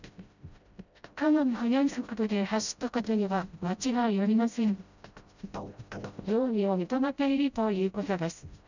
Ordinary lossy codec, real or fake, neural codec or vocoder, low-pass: none; fake; codec, 16 kHz, 0.5 kbps, FreqCodec, smaller model; 7.2 kHz